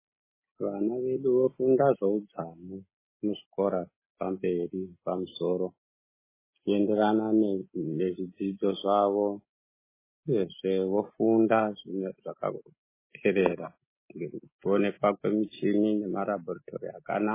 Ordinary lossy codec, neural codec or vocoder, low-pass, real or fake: MP3, 16 kbps; none; 3.6 kHz; real